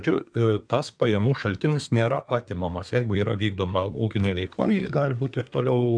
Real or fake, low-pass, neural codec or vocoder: fake; 9.9 kHz; codec, 24 kHz, 1 kbps, SNAC